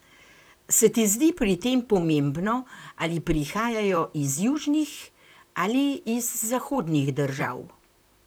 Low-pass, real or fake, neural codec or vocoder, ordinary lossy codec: none; fake; vocoder, 44.1 kHz, 128 mel bands, Pupu-Vocoder; none